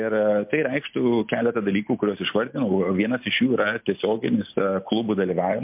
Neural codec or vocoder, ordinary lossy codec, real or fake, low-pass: none; MP3, 32 kbps; real; 3.6 kHz